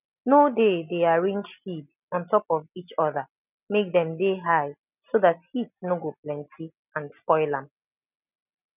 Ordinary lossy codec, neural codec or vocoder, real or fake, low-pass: none; none; real; 3.6 kHz